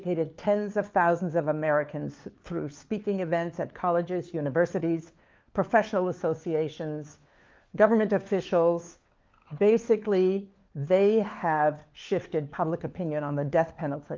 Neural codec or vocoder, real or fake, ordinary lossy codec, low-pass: codec, 16 kHz, 4 kbps, FunCodec, trained on LibriTTS, 50 frames a second; fake; Opus, 32 kbps; 7.2 kHz